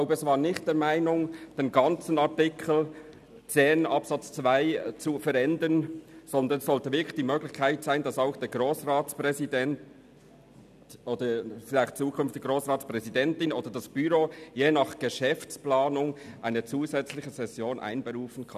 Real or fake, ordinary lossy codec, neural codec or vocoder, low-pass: real; none; none; 14.4 kHz